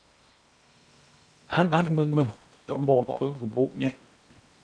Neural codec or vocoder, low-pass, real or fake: codec, 16 kHz in and 24 kHz out, 0.8 kbps, FocalCodec, streaming, 65536 codes; 9.9 kHz; fake